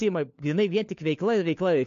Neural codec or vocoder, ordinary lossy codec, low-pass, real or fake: codec, 16 kHz, 4 kbps, FunCodec, trained on LibriTTS, 50 frames a second; AAC, 48 kbps; 7.2 kHz; fake